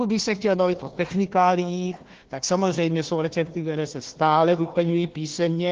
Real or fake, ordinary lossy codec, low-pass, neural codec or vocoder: fake; Opus, 16 kbps; 7.2 kHz; codec, 16 kHz, 1 kbps, FunCodec, trained on Chinese and English, 50 frames a second